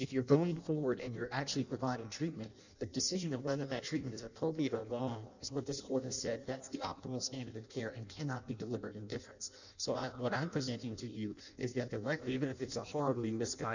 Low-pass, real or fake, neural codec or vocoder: 7.2 kHz; fake; codec, 16 kHz in and 24 kHz out, 0.6 kbps, FireRedTTS-2 codec